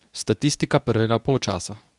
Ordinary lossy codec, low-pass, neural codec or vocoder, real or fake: none; 10.8 kHz; codec, 24 kHz, 0.9 kbps, WavTokenizer, medium speech release version 2; fake